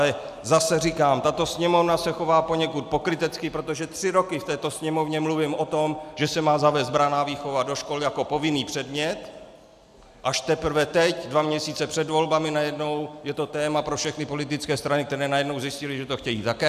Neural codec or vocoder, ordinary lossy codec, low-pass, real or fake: none; AAC, 96 kbps; 14.4 kHz; real